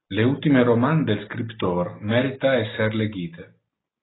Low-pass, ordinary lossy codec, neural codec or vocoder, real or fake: 7.2 kHz; AAC, 16 kbps; none; real